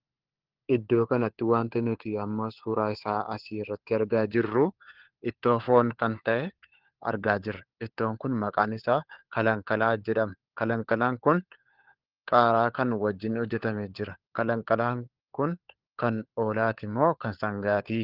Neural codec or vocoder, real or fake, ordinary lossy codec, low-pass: codec, 16 kHz, 4 kbps, FunCodec, trained on LibriTTS, 50 frames a second; fake; Opus, 16 kbps; 5.4 kHz